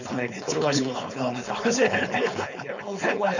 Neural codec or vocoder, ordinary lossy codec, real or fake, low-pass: codec, 16 kHz, 4.8 kbps, FACodec; none; fake; 7.2 kHz